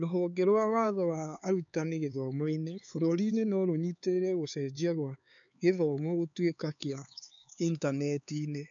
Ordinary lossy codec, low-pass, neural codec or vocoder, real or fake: none; 7.2 kHz; codec, 16 kHz, 4 kbps, X-Codec, HuBERT features, trained on LibriSpeech; fake